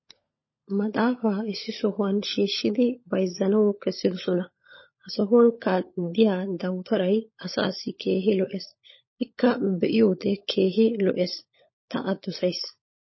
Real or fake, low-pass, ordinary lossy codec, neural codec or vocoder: fake; 7.2 kHz; MP3, 24 kbps; codec, 16 kHz, 16 kbps, FunCodec, trained on LibriTTS, 50 frames a second